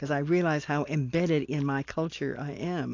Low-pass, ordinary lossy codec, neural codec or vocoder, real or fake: 7.2 kHz; AAC, 48 kbps; none; real